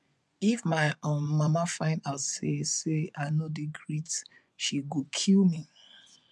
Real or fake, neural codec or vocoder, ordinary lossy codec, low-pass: fake; vocoder, 24 kHz, 100 mel bands, Vocos; none; none